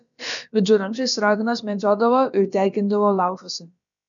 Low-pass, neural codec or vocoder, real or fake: 7.2 kHz; codec, 16 kHz, about 1 kbps, DyCAST, with the encoder's durations; fake